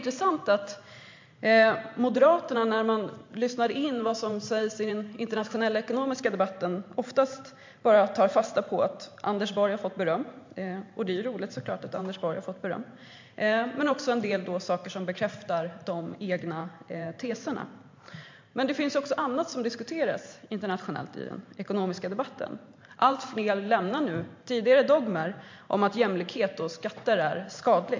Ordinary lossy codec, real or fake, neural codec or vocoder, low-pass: MP3, 48 kbps; fake; vocoder, 44.1 kHz, 128 mel bands every 512 samples, BigVGAN v2; 7.2 kHz